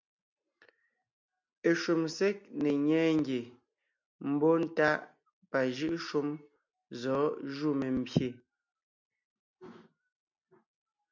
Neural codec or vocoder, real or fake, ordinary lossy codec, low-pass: none; real; MP3, 64 kbps; 7.2 kHz